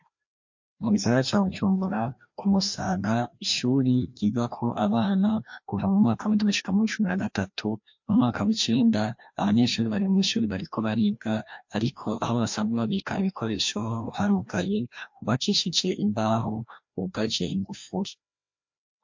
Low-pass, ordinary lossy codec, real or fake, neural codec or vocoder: 7.2 kHz; MP3, 48 kbps; fake; codec, 16 kHz, 1 kbps, FreqCodec, larger model